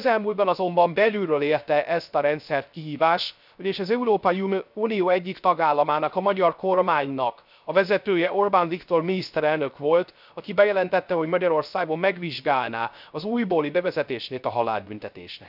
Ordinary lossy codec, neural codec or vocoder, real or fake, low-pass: none; codec, 16 kHz, 0.3 kbps, FocalCodec; fake; 5.4 kHz